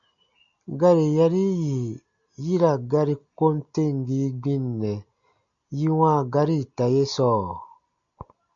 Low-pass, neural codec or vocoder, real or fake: 7.2 kHz; none; real